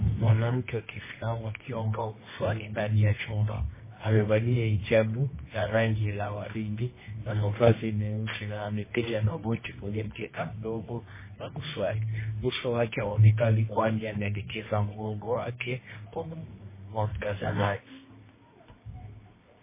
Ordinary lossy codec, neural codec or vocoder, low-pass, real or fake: MP3, 16 kbps; codec, 24 kHz, 0.9 kbps, WavTokenizer, medium music audio release; 3.6 kHz; fake